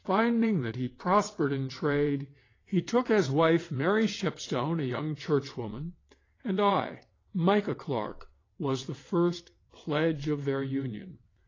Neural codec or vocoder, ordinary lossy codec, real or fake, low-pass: vocoder, 22.05 kHz, 80 mel bands, WaveNeXt; AAC, 32 kbps; fake; 7.2 kHz